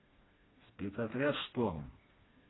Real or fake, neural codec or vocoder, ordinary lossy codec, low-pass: fake; codec, 16 kHz, 2 kbps, FreqCodec, smaller model; AAC, 16 kbps; 7.2 kHz